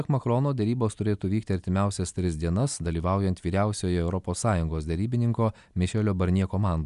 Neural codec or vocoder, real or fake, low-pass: none; real; 10.8 kHz